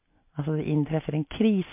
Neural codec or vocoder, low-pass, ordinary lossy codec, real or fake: none; 3.6 kHz; MP3, 24 kbps; real